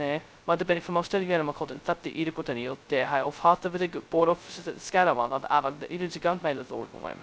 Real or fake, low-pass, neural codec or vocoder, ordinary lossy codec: fake; none; codec, 16 kHz, 0.2 kbps, FocalCodec; none